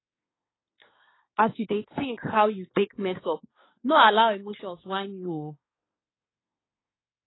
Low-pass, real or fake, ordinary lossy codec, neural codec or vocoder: 7.2 kHz; fake; AAC, 16 kbps; codec, 24 kHz, 1 kbps, SNAC